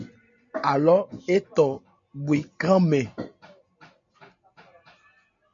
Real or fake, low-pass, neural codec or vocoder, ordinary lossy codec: real; 7.2 kHz; none; MP3, 64 kbps